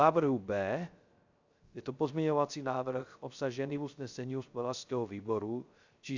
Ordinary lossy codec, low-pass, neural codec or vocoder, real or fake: Opus, 64 kbps; 7.2 kHz; codec, 16 kHz, 0.3 kbps, FocalCodec; fake